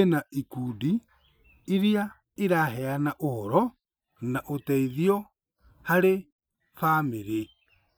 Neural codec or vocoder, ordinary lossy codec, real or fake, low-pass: none; none; real; none